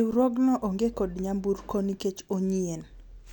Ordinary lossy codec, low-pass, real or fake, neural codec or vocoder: none; 19.8 kHz; real; none